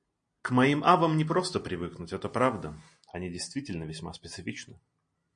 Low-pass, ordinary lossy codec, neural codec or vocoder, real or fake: 9.9 kHz; MP3, 48 kbps; none; real